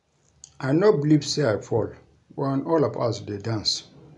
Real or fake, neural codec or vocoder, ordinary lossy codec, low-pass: real; none; none; 10.8 kHz